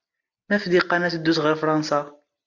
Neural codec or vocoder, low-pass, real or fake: none; 7.2 kHz; real